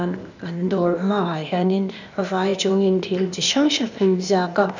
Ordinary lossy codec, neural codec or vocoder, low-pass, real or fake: none; codec, 16 kHz, 0.8 kbps, ZipCodec; 7.2 kHz; fake